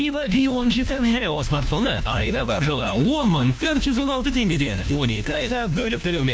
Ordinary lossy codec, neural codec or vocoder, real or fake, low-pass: none; codec, 16 kHz, 1 kbps, FunCodec, trained on LibriTTS, 50 frames a second; fake; none